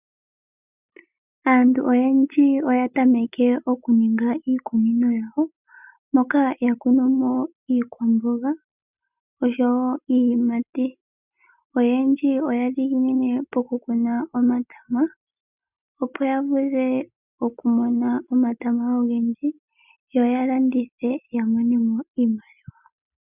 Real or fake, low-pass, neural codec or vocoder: real; 3.6 kHz; none